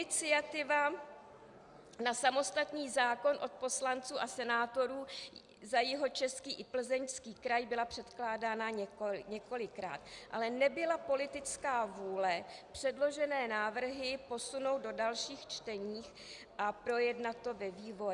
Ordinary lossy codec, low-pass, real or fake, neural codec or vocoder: Opus, 64 kbps; 10.8 kHz; real; none